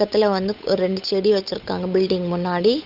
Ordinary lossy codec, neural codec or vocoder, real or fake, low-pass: none; codec, 16 kHz, 8 kbps, FunCodec, trained on Chinese and English, 25 frames a second; fake; 5.4 kHz